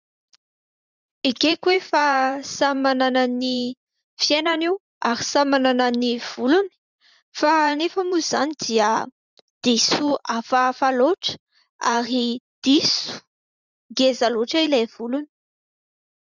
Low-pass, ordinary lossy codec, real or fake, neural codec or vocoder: 7.2 kHz; Opus, 64 kbps; fake; vocoder, 44.1 kHz, 128 mel bands every 512 samples, BigVGAN v2